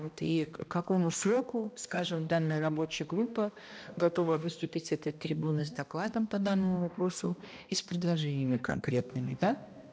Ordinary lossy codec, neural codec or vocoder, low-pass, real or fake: none; codec, 16 kHz, 1 kbps, X-Codec, HuBERT features, trained on balanced general audio; none; fake